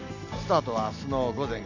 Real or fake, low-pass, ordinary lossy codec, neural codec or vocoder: real; 7.2 kHz; none; none